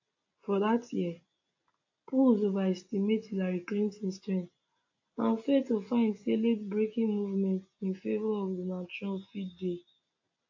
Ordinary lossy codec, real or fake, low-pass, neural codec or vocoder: AAC, 48 kbps; real; 7.2 kHz; none